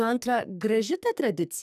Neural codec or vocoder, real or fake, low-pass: codec, 44.1 kHz, 2.6 kbps, SNAC; fake; 14.4 kHz